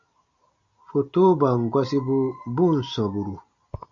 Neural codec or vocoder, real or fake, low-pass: none; real; 7.2 kHz